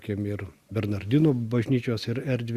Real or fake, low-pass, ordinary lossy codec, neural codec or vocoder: real; 14.4 kHz; Opus, 64 kbps; none